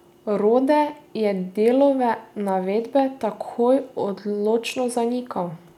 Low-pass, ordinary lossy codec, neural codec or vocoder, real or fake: 19.8 kHz; none; none; real